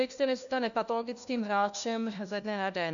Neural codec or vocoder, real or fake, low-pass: codec, 16 kHz, 1 kbps, FunCodec, trained on LibriTTS, 50 frames a second; fake; 7.2 kHz